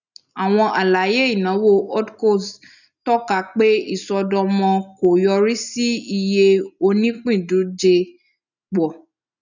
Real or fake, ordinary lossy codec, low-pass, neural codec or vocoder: real; none; 7.2 kHz; none